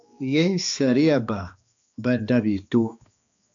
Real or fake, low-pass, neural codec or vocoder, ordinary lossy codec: fake; 7.2 kHz; codec, 16 kHz, 2 kbps, X-Codec, HuBERT features, trained on balanced general audio; AAC, 64 kbps